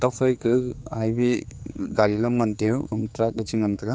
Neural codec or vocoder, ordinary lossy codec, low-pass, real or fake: codec, 16 kHz, 4 kbps, X-Codec, HuBERT features, trained on general audio; none; none; fake